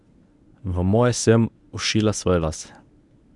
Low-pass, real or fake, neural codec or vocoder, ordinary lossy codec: 10.8 kHz; fake; codec, 24 kHz, 0.9 kbps, WavTokenizer, medium speech release version 1; none